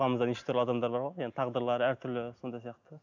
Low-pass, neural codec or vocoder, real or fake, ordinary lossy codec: 7.2 kHz; none; real; none